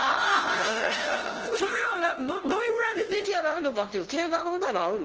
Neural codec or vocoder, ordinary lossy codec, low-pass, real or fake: codec, 16 kHz, 0.5 kbps, FunCodec, trained on LibriTTS, 25 frames a second; Opus, 16 kbps; 7.2 kHz; fake